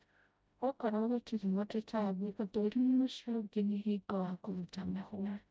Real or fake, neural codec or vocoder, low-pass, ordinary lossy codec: fake; codec, 16 kHz, 0.5 kbps, FreqCodec, smaller model; none; none